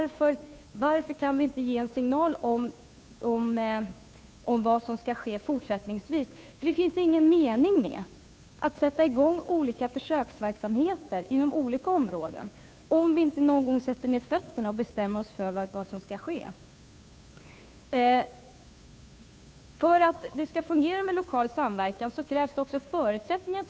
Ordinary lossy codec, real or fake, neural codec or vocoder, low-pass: none; fake; codec, 16 kHz, 2 kbps, FunCodec, trained on Chinese and English, 25 frames a second; none